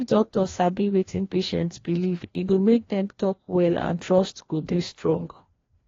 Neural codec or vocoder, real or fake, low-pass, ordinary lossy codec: codec, 16 kHz, 1 kbps, FreqCodec, larger model; fake; 7.2 kHz; AAC, 32 kbps